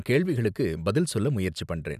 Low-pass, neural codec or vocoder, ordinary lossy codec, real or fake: 14.4 kHz; none; none; real